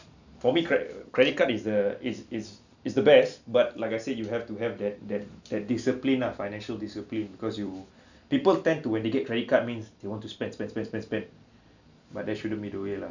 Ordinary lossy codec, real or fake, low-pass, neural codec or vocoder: none; real; 7.2 kHz; none